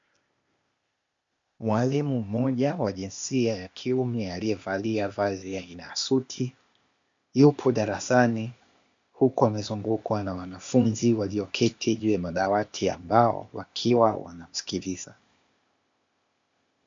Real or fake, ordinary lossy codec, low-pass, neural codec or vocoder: fake; MP3, 48 kbps; 7.2 kHz; codec, 16 kHz, 0.8 kbps, ZipCodec